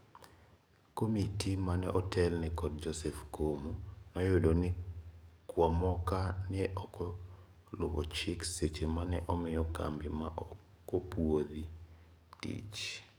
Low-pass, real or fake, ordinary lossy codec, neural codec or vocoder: none; fake; none; codec, 44.1 kHz, 7.8 kbps, DAC